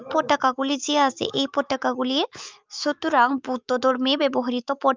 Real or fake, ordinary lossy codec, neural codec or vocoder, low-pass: fake; Opus, 24 kbps; autoencoder, 48 kHz, 128 numbers a frame, DAC-VAE, trained on Japanese speech; 7.2 kHz